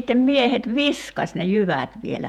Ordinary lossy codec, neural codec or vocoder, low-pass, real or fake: none; vocoder, 48 kHz, 128 mel bands, Vocos; 19.8 kHz; fake